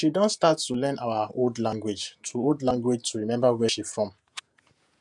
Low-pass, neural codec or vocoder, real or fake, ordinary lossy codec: 10.8 kHz; vocoder, 48 kHz, 128 mel bands, Vocos; fake; none